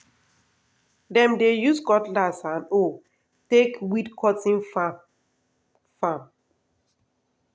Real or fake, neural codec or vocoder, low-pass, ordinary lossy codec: real; none; none; none